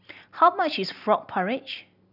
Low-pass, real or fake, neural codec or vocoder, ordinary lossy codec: 5.4 kHz; real; none; none